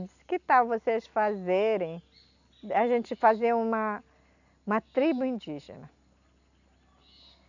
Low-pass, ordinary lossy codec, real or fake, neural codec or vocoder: 7.2 kHz; none; real; none